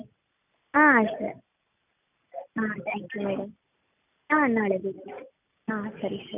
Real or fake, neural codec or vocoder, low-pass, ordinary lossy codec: real; none; 3.6 kHz; none